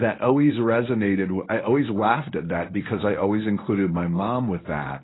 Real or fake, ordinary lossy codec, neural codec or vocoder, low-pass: fake; AAC, 16 kbps; codec, 24 kHz, 0.9 kbps, WavTokenizer, medium speech release version 1; 7.2 kHz